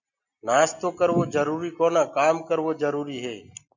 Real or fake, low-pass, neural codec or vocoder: real; 7.2 kHz; none